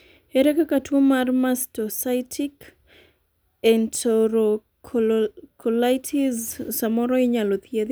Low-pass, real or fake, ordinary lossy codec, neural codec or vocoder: none; real; none; none